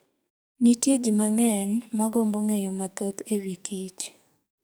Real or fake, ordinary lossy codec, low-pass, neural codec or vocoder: fake; none; none; codec, 44.1 kHz, 2.6 kbps, SNAC